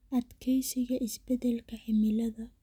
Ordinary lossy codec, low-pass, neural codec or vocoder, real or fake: none; 19.8 kHz; none; real